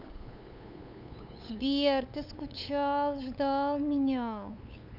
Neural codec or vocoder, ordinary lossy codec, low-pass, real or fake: codec, 16 kHz, 8 kbps, FunCodec, trained on LibriTTS, 25 frames a second; none; 5.4 kHz; fake